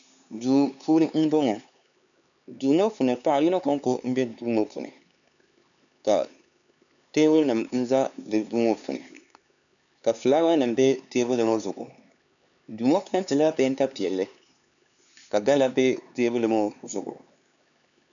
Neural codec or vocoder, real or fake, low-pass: codec, 16 kHz, 4 kbps, X-Codec, HuBERT features, trained on LibriSpeech; fake; 7.2 kHz